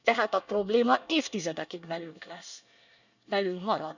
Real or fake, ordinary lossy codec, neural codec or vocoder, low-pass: fake; none; codec, 24 kHz, 1 kbps, SNAC; 7.2 kHz